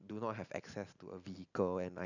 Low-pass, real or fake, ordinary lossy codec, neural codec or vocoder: 7.2 kHz; real; none; none